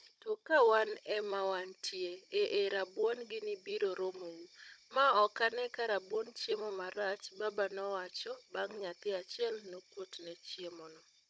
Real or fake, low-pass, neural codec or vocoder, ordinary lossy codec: fake; none; codec, 16 kHz, 16 kbps, FunCodec, trained on Chinese and English, 50 frames a second; none